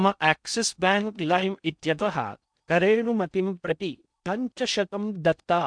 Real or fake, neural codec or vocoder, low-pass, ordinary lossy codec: fake; codec, 16 kHz in and 24 kHz out, 0.6 kbps, FocalCodec, streaming, 2048 codes; 9.9 kHz; none